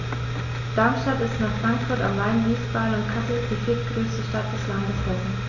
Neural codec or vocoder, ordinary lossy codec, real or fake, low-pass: none; none; real; 7.2 kHz